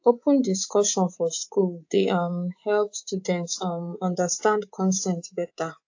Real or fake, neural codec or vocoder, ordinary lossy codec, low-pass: fake; codec, 24 kHz, 3.1 kbps, DualCodec; AAC, 48 kbps; 7.2 kHz